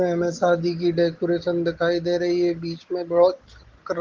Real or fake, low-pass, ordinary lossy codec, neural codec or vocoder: real; 7.2 kHz; Opus, 16 kbps; none